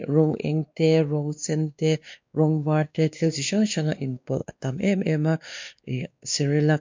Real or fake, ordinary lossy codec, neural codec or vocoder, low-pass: fake; MP3, 64 kbps; codec, 16 kHz, 2 kbps, X-Codec, WavLM features, trained on Multilingual LibriSpeech; 7.2 kHz